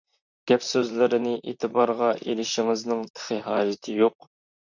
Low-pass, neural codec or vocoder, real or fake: 7.2 kHz; vocoder, 44.1 kHz, 128 mel bands, Pupu-Vocoder; fake